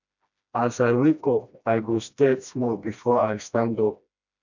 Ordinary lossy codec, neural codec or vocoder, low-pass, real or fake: none; codec, 16 kHz, 1 kbps, FreqCodec, smaller model; 7.2 kHz; fake